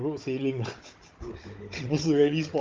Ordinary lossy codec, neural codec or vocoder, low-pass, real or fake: Opus, 24 kbps; codec, 16 kHz, 16 kbps, FunCodec, trained on Chinese and English, 50 frames a second; 7.2 kHz; fake